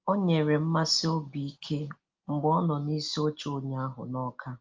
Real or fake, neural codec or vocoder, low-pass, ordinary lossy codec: real; none; 7.2 kHz; Opus, 24 kbps